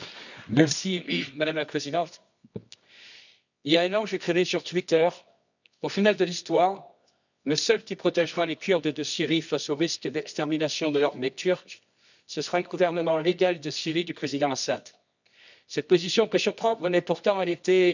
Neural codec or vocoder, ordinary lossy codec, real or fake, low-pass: codec, 24 kHz, 0.9 kbps, WavTokenizer, medium music audio release; none; fake; 7.2 kHz